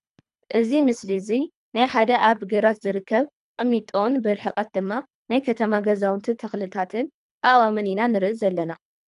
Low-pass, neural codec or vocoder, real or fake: 10.8 kHz; codec, 24 kHz, 3 kbps, HILCodec; fake